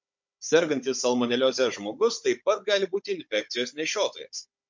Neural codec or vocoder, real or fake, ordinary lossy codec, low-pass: codec, 16 kHz, 16 kbps, FunCodec, trained on Chinese and English, 50 frames a second; fake; MP3, 48 kbps; 7.2 kHz